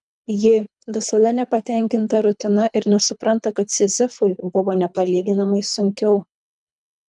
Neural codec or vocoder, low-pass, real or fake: codec, 24 kHz, 3 kbps, HILCodec; 10.8 kHz; fake